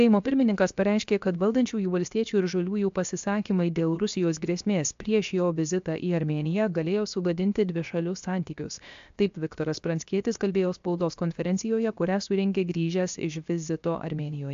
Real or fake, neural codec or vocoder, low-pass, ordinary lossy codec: fake; codec, 16 kHz, 0.7 kbps, FocalCodec; 7.2 kHz; AAC, 64 kbps